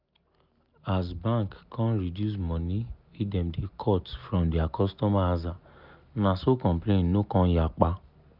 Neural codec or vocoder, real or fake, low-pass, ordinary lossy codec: none; real; 5.4 kHz; none